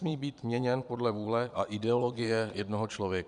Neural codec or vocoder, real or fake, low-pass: vocoder, 22.05 kHz, 80 mel bands, Vocos; fake; 9.9 kHz